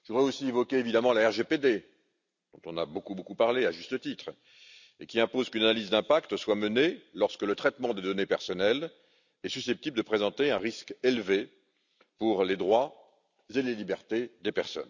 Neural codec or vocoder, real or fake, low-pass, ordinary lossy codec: none; real; 7.2 kHz; none